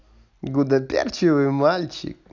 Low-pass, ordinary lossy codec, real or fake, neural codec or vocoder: 7.2 kHz; none; real; none